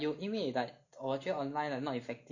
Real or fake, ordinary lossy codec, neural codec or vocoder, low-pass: real; none; none; 7.2 kHz